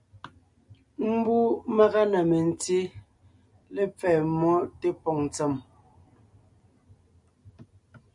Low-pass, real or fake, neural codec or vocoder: 10.8 kHz; real; none